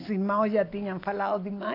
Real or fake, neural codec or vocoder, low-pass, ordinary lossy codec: real; none; 5.4 kHz; AAC, 32 kbps